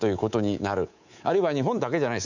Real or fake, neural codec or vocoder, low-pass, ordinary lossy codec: fake; codec, 24 kHz, 3.1 kbps, DualCodec; 7.2 kHz; none